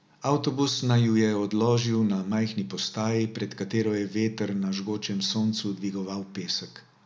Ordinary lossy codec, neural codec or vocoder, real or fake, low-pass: none; none; real; none